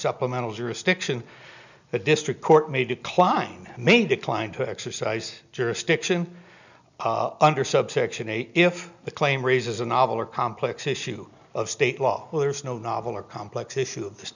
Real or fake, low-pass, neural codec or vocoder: real; 7.2 kHz; none